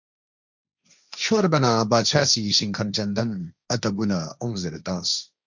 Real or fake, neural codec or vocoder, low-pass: fake; codec, 16 kHz, 1.1 kbps, Voila-Tokenizer; 7.2 kHz